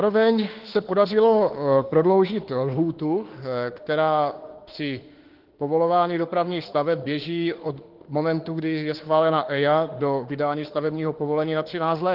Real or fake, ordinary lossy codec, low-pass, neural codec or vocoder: fake; Opus, 16 kbps; 5.4 kHz; autoencoder, 48 kHz, 32 numbers a frame, DAC-VAE, trained on Japanese speech